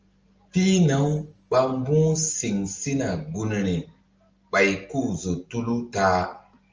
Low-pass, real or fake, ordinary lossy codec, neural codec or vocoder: 7.2 kHz; real; Opus, 24 kbps; none